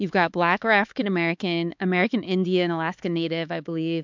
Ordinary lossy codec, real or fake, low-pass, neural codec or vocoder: MP3, 64 kbps; fake; 7.2 kHz; codec, 16 kHz, 4 kbps, X-Codec, HuBERT features, trained on LibriSpeech